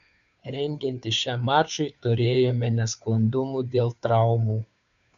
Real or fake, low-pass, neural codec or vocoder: fake; 7.2 kHz; codec, 16 kHz, 2 kbps, FunCodec, trained on Chinese and English, 25 frames a second